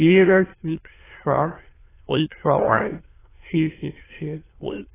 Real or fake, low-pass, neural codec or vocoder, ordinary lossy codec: fake; 3.6 kHz; autoencoder, 22.05 kHz, a latent of 192 numbers a frame, VITS, trained on many speakers; AAC, 16 kbps